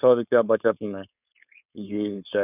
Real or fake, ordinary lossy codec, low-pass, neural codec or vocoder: fake; none; 3.6 kHz; codec, 16 kHz, 4.8 kbps, FACodec